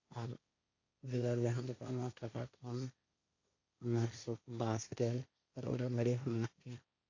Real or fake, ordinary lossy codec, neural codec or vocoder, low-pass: fake; AAC, 48 kbps; codec, 16 kHz, 1.1 kbps, Voila-Tokenizer; 7.2 kHz